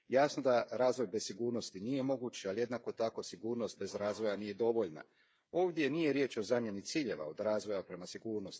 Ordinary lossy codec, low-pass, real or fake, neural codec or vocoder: none; none; fake; codec, 16 kHz, 8 kbps, FreqCodec, smaller model